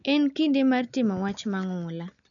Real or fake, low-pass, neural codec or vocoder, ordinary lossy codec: real; 7.2 kHz; none; none